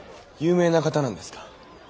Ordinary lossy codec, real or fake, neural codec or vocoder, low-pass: none; real; none; none